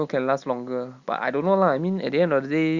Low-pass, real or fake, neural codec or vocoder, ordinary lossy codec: 7.2 kHz; real; none; Opus, 64 kbps